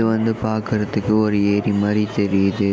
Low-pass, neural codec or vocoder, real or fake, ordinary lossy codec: none; none; real; none